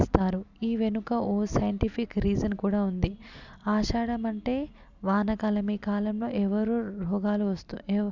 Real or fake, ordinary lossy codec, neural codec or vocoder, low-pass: real; none; none; 7.2 kHz